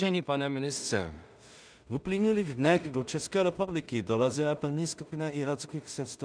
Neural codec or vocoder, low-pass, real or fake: codec, 16 kHz in and 24 kHz out, 0.4 kbps, LongCat-Audio-Codec, two codebook decoder; 9.9 kHz; fake